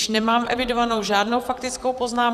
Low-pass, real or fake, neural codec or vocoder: 14.4 kHz; fake; vocoder, 44.1 kHz, 128 mel bands, Pupu-Vocoder